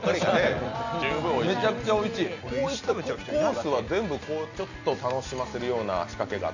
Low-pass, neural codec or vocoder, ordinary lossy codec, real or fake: 7.2 kHz; none; none; real